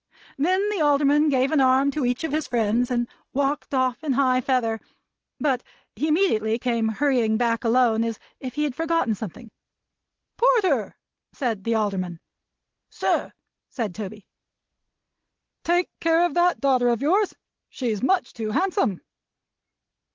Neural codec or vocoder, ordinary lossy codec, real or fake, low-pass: none; Opus, 16 kbps; real; 7.2 kHz